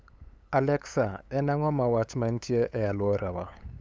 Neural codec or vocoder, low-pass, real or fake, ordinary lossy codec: codec, 16 kHz, 8 kbps, FunCodec, trained on LibriTTS, 25 frames a second; none; fake; none